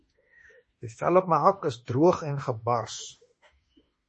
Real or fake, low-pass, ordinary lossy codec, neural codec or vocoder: fake; 10.8 kHz; MP3, 32 kbps; autoencoder, 48 kHz, 32 numbers a frame, DAC-VAE, trained on Japanese speech